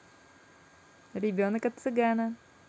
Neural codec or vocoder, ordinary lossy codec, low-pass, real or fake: none; none; none; real